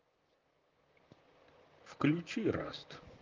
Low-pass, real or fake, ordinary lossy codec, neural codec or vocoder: 7.2 kHz; fake; Opus, 24 kbps; vocoder, 44.1 kHz, 128 mel bands, Pupu-Vocoder